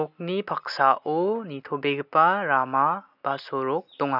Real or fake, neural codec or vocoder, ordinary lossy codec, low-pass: real; none; none; 5.4 kHz